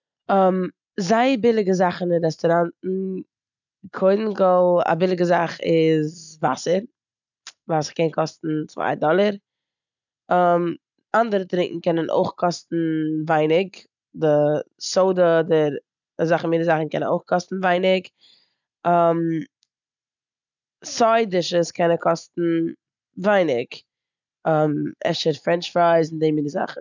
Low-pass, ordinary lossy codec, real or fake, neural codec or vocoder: 7.2 kHz; none; real; none